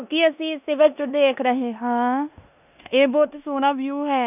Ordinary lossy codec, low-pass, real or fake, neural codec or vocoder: none; 3.6 kHz; fake; codec, 16 kHz in and 24 kHz out, 0.9 kbps, LongCat-Audio-Codec, four codebook decoder